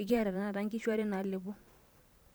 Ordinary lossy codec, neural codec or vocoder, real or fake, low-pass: none; vocoder, 44.1 kHz, 128 mel bands every 512 samples, BigVGAN v2; fake; none